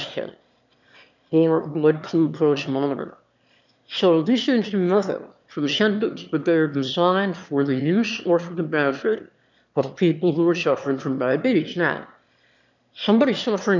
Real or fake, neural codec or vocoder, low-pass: fake; autoencoder, 22.05 kHz, a latent of 192 numbers a frame, VITS, trained on one speaker; 7.2 kHz